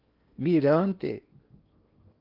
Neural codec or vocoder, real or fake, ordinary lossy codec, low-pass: codec, 16 kHz in and 24 kHz out, 0.8 kbps, FocalCodec, streaming, 65536 codes; fake; Opus, 32 kbps; 5.4 kHz